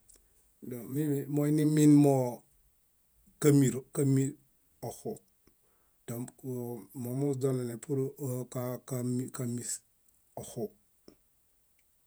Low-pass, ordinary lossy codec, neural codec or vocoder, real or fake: none; none; vocoder, 48 kHz, 128 mel bands, Vocos; fake